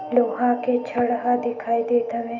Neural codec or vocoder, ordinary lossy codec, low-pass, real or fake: autoencoder, 48 kHz, 128 numbers a frame, DAC-VAE, trained on Japanese speech; none; 7.2 kHz; fake